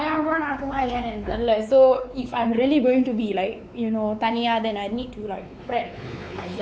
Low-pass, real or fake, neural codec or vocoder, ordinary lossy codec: none; fake; codec, 16 kHz, 4 kbps, X-Codec, WavLM features, trained on Multilingual LibriSpeech; none